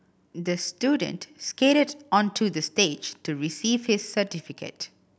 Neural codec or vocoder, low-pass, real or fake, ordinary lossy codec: none; none; real; none